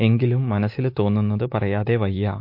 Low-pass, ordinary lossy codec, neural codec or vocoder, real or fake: 5.4 kHz; MP3, 32 kbps; autoencoder, 48 kHz, 128 numbers a frame, DAC-VAE, trained on Japanese speech; fake